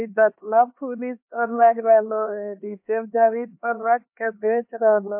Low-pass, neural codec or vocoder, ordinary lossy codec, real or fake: 3.6 kHz; codec, 16 kHz, 2 kbps, X-Codec, HuBERT features, trained on LibriSpeech; MP3, 32 kbps; fake